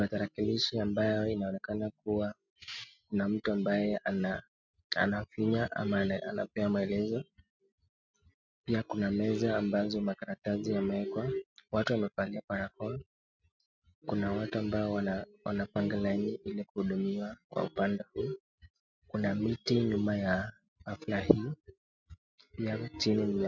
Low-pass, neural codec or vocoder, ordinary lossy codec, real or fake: 7.2 kHz; none; MP3, 64 kbps; real